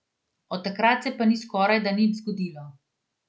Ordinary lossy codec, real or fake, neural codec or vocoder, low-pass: none; real; none; none